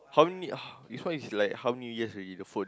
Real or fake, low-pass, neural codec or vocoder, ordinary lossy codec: real; none; none; none